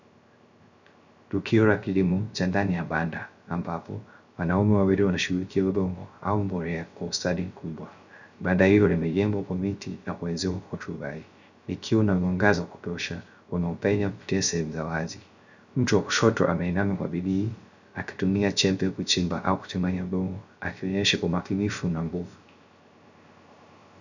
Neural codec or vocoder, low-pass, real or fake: codec, 16 kHz, 0.3 kbps, FocalCodec; 7.2 kHz; fake